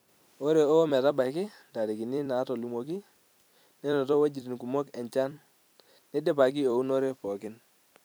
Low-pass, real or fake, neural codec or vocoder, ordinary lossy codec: none; fake; vocoder, 44.1 kHz, 128 mel bands every 256 samples, BigVGAN v2; none